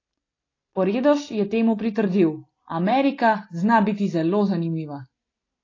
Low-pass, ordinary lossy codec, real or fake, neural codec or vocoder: 7.2 kHz; AAC, 32 kbps; real; none